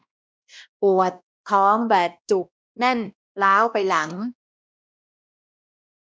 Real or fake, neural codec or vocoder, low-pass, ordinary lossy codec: fake; codec, 16 kHz, 1 kbps, X-Codec, WavLM features, trained on Multilingual LibriSpeech; none; none